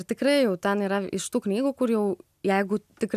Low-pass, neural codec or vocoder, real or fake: 14.4 kHz; none; real